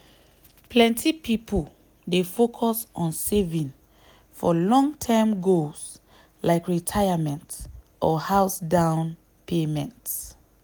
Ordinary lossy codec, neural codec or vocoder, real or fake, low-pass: none; none; real; none